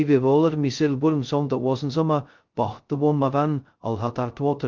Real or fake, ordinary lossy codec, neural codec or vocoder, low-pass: fake; Opus, 32 kbps; codec, 16 kHz, 0.2 kbps, FocalCodec; 7.2 kHz